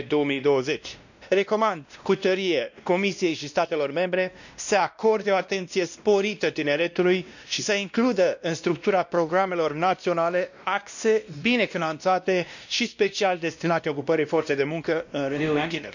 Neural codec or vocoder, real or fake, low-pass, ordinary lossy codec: codec, 16 kHz, 1 kbps, X-Codec, WavLM features, trained on Multilingual LibriSpeech; fake; 7.2 kHz; none